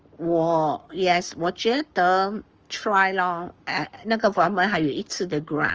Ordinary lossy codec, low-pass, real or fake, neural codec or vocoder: Opus, 24 kbps; 7.2 kHz; fake; codec, 44.1 kHz, 7.8 kbps, Pupu-Codec